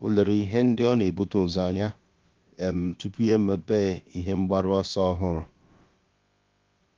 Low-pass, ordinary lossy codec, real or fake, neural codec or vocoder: 7.2 kHz; Opus, 32 kbps; fake; codec, 16 kHz, about 1 kbps, DyCAST, with the encoder's durations